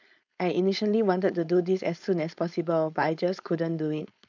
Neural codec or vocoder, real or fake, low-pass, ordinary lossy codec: codec, 16 kHz, 4.8 kbps, FACodec; fake; 7.2 kHz; none